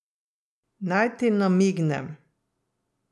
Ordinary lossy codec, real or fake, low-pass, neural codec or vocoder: none; real; none; none